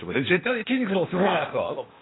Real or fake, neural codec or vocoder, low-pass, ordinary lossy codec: fake; codec, 16 kHz, 0.8 kbps, ZipCodec; 7.2 kHz; AAC, 16 kbps